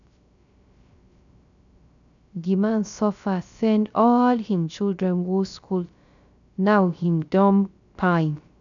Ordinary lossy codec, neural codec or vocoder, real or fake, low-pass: none; codec, 16 kHz, 0.3 kbps, FocalCodec; fake; 7.2 kHz